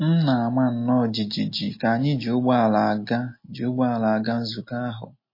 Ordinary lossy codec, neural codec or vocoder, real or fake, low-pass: MP3, 24 kbps; none; real; 5.4 kHz